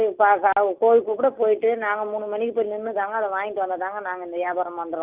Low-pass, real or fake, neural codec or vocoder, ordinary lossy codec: 3.6 kHz; real; none; Opus, 32 kbps